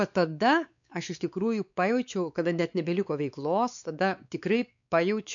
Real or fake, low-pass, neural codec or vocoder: fake; 7.2 kHz; codec, 16 kHz, 4 kbps, X-Codec, WavLM features, trained on Multilingual LibriSpeech